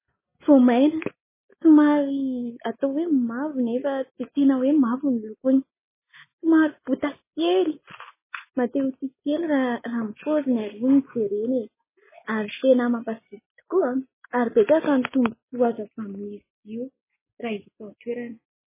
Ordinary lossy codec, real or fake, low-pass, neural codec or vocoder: MP3, 16 kbps; real; 3.6 kHz; none